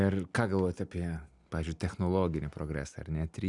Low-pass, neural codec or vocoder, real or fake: 10.8 kHz; none; real